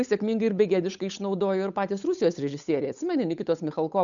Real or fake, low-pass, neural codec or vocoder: fake; 7.2 kHz; codec, 16 kHz, 8 kbps, FunCodec, trained on Chinese and English, 25 frames a second